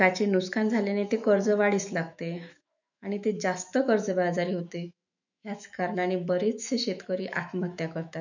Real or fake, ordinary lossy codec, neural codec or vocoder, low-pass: fake; none; autoencoder, 48 kHz, 128 numbers a frame, DAC-VAE, trained on Japanese speech; 7.2 kHz